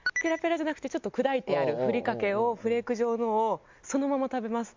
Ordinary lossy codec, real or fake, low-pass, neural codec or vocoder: MP3, 64 kbps; real; 7.2 kHz; none